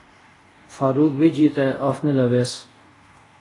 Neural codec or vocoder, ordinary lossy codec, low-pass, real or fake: codec, 24 kHz, 0.5 kbps, DualCodec; AAC, 32 kbps; 10.8 kHz; fake